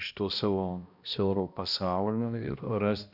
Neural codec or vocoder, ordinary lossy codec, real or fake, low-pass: codec, 16 kHz, 1 kbps, X-Codec, HuBERT features, trained on balanced general audio; Opus, 64 kbps; fake; 5.4 kHz